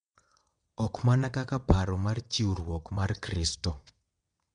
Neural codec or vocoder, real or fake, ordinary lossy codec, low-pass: vocoder, 22.05 kHz, 80 mel bands, WaveNeXt; fake; MP3, 64 kbps; 9.9 kHz